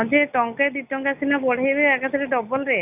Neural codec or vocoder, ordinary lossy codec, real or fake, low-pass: none; none; real; 3.6 kHz